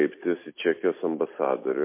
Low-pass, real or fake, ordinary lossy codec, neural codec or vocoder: 3.6 kHz; real; MP3, 24 kbps; none